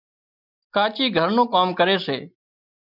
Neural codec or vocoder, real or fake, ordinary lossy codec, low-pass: none; real; MP3, 48 kbps; 5.4 kHz